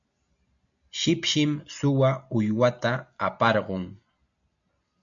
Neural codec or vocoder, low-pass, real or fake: none; 7.2 kHz; real